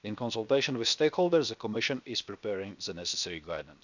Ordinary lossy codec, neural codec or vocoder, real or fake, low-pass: none; codec, 16 kHz, 0.7 kbps, FocalCodec; fake; 7.2 kHz